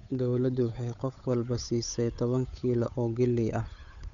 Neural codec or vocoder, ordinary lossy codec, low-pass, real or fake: codec, 16 kHz, 8 kbps, FunCodec, trained on Chinese and English, 25 frames a second; none; 7.2 kHz; fake